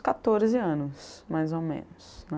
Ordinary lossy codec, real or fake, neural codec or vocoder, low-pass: none; real; none; none